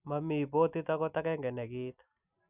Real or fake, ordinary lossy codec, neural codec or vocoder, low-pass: real; none; none; 3.6 kHz